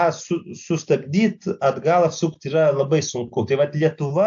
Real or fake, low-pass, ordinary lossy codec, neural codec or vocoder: real; 7.2 kHz; AAC, 64 kbps; none